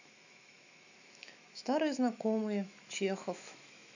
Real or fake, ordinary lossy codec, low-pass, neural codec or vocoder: fake; none; 7.2 kHz; autoencoder, 48 kHz, 128 numbers a frame, DAC-VAE, trained on Japanese speech